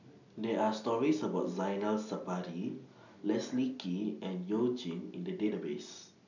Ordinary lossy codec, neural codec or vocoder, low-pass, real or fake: none; none; 7.2 kHz; real